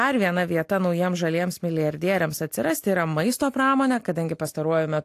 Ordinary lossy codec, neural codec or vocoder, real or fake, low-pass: AAC, 64 kbps; none; real; 14.4 kHz